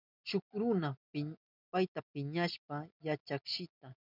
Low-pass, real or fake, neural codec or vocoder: 5.4 kHz; real; none